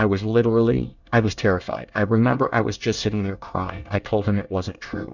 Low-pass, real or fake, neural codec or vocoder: 7.2 kHz; fake; codec, 24 kHz, 1 kbps, SNAC